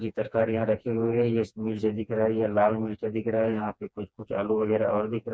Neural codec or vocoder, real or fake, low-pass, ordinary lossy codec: codec, 16 kHz, 2 kbps, FreqCodec, smaller model; fake; none; none